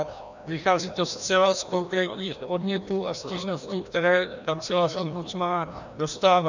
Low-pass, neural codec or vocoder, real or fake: 7.2 kHz; codec, 16 kHz, 1 kbps, FreqCodec, larger model; fake